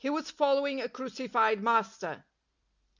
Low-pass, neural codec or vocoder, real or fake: 7.2 kHz; none; real